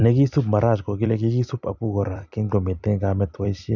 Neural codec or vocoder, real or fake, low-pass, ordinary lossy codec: vocoder, 44.1 kHz, 128 mel bands every 256 samples, BigVGAN v2; fake; 7.2 kHz; none